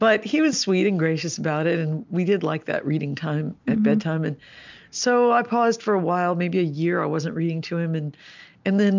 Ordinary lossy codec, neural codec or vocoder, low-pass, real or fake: MP3, 64 kbps; none; 7.2 kHz; real